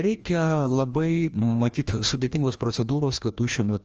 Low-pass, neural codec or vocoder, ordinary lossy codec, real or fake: 7.2 kHz; codec, 16 kHz, 1 kbps, FreqCodec, larger model; Opus, 24 kbps; fake